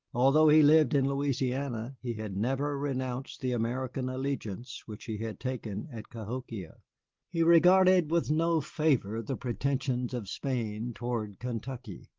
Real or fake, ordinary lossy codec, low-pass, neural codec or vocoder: real; Opus, 16 kbps; 7.2 kHz; none